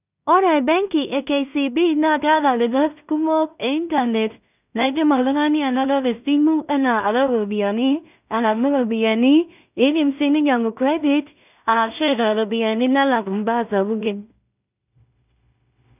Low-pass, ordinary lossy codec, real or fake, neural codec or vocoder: 3.6 kHz; none; fake; codec, 16 kHz in and 24 kHz out, 0.4 kbps, LongCat-Audio-Codec, two codebook decoder